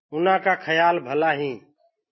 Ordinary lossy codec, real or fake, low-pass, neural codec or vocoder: MP3, 24 kbps; real; 7.2 kHz; none